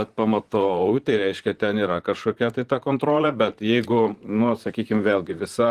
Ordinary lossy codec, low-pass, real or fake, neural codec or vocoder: Opus, 24 kbps; 14.4 kHz; fake; vocoder, 44.1 kHz, 128 mel bands, Pupu-Vocoder